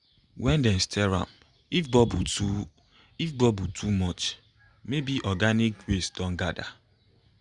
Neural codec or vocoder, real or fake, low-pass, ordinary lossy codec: none; real; 10.8 kHz; Opus, 64 kbps